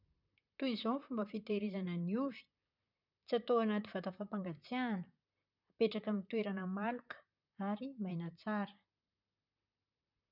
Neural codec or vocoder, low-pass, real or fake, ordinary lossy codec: vocoder, 44.1 kHz, 128 mel bands, Pupu-Vocoder; 5.4 kHz; fake; none